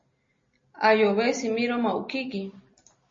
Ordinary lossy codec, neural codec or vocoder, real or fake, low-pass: MP3, 32 kbps; none; real; 7.2 kHz